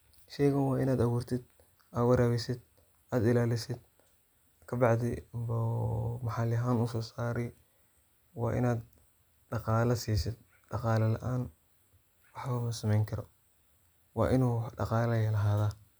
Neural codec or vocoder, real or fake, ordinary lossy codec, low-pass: none; real; none; none